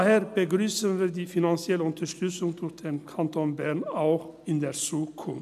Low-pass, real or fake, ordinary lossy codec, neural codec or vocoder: 14.4 kHz; real; none; none